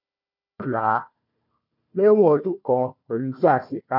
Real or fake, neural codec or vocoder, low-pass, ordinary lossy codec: fake; codec, 16 kHz, 1 kbps, FunCodec, trained on Chinese and English, 50 frames a second; 5.4 kHz; none